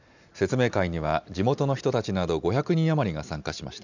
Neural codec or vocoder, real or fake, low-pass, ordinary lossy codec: none; real; 7.2 kHz; none